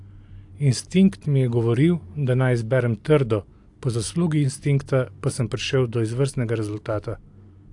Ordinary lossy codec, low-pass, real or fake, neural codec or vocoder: AAC, 64 kbps; 10.8 kHz; real; none